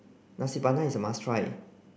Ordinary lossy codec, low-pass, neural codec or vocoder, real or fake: none; none; none; real